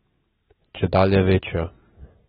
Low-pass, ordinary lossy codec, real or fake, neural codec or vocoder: 19.8 kHz; AAC, 16 kbps; real; none